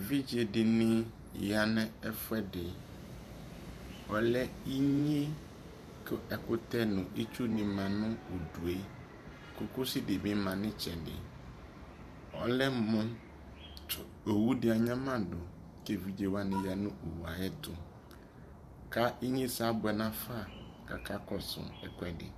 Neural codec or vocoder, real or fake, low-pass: vocoder, 44.1 kHz, 128 mel bands every 512 samples, BigVGAN v2; fake; 14.4 kHz